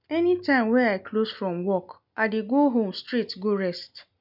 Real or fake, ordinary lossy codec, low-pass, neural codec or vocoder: real; none; 5.4 kHz; none